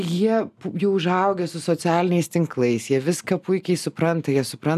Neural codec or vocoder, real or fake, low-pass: none; real; 14.4 kHz